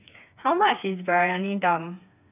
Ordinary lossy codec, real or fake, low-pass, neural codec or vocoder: none; fake; 3.6 kHz; codec, 16 kHz, 4 kbps, FreqCodec, smaller model